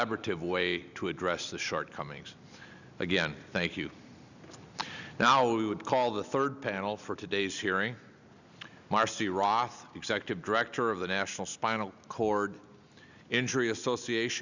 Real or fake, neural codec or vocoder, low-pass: real; none; 7.2 kHz